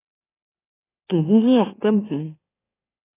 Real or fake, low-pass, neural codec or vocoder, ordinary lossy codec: fake; 3.6 kHz; autoencoder, 44.1 kHz, a latent of 192 numbers a frame, MeloTTS; AAC, 16 kbps